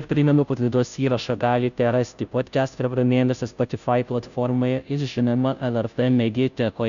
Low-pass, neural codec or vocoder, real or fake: 7.2 kHz; codec, 16 kHz, 0.5 kbps, FunCodec, trained on Chinese and English, 25 frames a second; fake